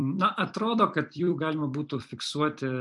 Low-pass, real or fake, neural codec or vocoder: 10.8 kHz; fake; vocoder, 44.1 kHz, 128 mel bands every 256 samples, BigVGAN v2